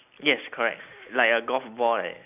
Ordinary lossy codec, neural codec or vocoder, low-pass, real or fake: none; none; 3.6 kHz; real